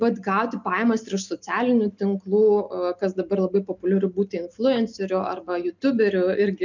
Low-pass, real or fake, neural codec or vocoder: 7.2 kHz; real; none